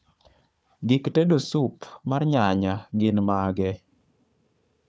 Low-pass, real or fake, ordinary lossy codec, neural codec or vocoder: none; fake; none; codec, 16 kHz, 4 kbps, FunCodec, trained on Chinese and English, 50 frames a second